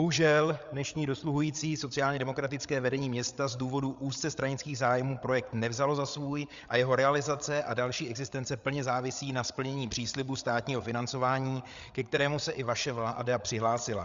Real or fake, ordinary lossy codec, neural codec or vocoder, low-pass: fake; Opus, 64 kbps; codec, 16 kHz, 8 kbps, FreqCodec, larger model; 7.2 kHz